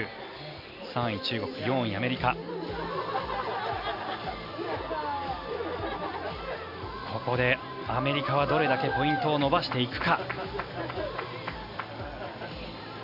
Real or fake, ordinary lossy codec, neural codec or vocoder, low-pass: real; none; none; 5.4 kHz